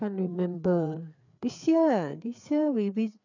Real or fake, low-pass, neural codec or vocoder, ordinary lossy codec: fake; 7.2 kHz; codec, 16 kHz, 4 kbps, FreqCodec, larger model; none